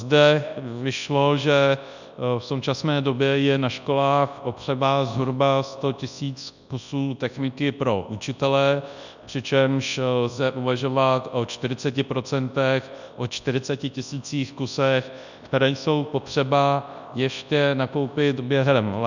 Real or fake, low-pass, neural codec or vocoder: fake; 7.2 kHz; codec, 24 kHz, 0.9 kbps, WavTokenizer, large speech release